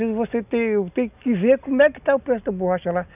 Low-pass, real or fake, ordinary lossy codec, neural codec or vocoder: 3.6 kHz; real; none; none